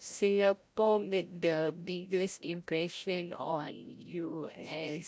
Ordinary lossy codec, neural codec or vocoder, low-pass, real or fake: none; codec, 16 kHz, 0.5 kbps, FreqCodec, larger model; none; fake